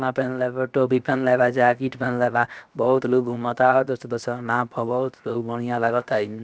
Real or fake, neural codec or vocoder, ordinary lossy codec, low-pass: fake; codec, 16 kHz, 0.7 kbps, FocalCodec; none; none